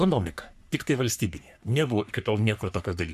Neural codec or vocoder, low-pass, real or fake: codec, 44.1 kHz, 3.4 kbps, Pupu-Codec; 14.4 kHz; fake